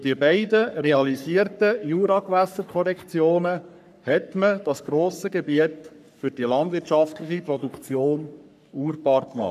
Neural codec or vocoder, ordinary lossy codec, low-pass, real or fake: codec, 44.1 kHz, 3.4 kbps, Pupu-Codec; none; 14.4 kHz; fake